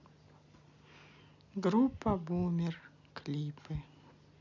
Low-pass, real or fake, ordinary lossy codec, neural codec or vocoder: 7.2 kHz; real; none; none